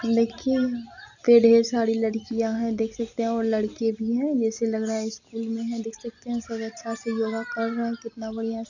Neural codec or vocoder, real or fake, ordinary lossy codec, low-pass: none; real; none; 7.2 kHz